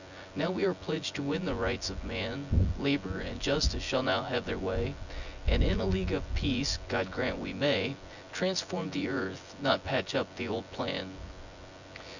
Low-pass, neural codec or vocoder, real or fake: 7.2 kHz; vocoder, 24 kHz, 100 mel bands, Vocos; fake